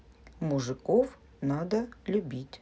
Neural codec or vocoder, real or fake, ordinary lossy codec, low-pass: none; real; none; none